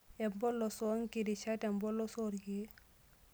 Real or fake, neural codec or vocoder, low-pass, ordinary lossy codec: real; none; none; none